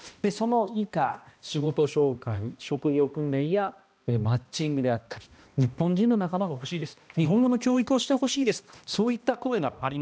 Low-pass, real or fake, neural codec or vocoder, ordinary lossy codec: none; fake; codec, 16 kHz, 1 kbps, X-Codec, HuBERT features, trained on balanced general audio; none